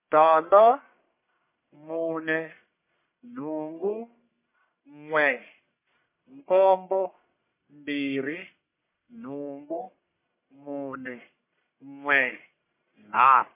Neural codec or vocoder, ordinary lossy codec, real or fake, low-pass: codec, 44.1 kHz, 1.7 kbps, Pupu-Codec; MP3, 24 kbps; fake; 3.6 kHz